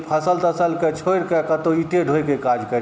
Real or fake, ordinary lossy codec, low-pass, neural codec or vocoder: real; none; none; none